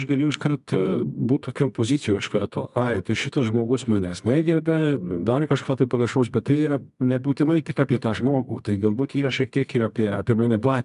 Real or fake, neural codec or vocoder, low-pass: fake; codec, 24 kHz, 0.9 kbps, WavTokenizer, medium music audio release; 10.8 kHz